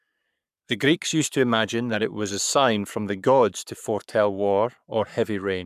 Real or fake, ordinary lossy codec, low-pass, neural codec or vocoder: fake; none; 14.4 kHz; codec, 44.1 kHz, 7.8 kbps, Pupu-Codec